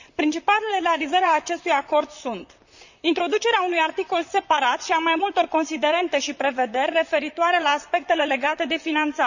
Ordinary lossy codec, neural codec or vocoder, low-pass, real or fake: none; vocoder, 44.1 kHz, 128 mel bands, Pupu-Vocoder; 7.2 kHz; fake